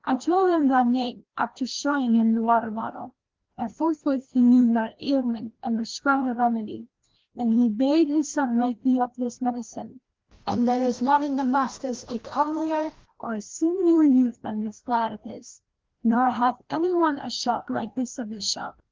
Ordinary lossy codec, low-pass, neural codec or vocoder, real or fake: Opus, 16 kbps; 7.2 kHz; codec, 16 kHz, 1 kbps, FreqCodec, larger model; fake